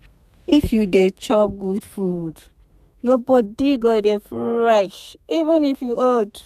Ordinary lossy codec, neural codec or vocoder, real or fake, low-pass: none; codec, 32 kHz, 1.9 kbps, SNAC; fake; 14.4 kHz